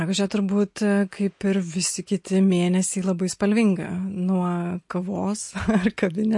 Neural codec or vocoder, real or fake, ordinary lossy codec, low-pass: none; real; MP3, 48 kbps; 10.8 kHz